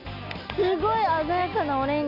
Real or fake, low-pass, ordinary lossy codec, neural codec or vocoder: real; 5.4 kHz; none; none